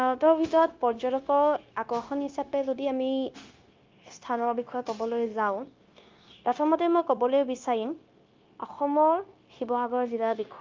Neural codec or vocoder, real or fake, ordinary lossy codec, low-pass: codec, 16 kHz, 0.9 kbps, LongCat-Audio-Codec; fake; Opus, 32 kbps; 7.2 kHz